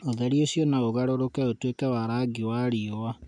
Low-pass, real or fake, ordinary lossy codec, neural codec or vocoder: 9.9 kHz; real; none; none